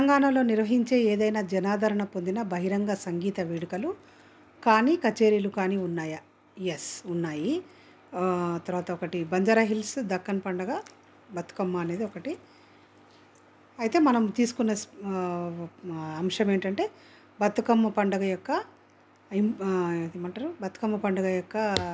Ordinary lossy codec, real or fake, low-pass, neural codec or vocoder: none; real; none; none